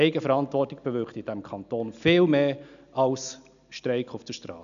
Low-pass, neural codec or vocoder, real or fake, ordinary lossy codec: 7.2 kHz; none; real; none